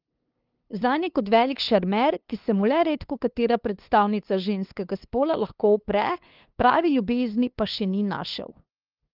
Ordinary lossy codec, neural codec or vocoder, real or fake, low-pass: Opus, 32 kbps; codec, 16 kHz, 2 kbps, FunCodec, trained on LibriTTS, 25 frames a second; fake; 5.4 kHz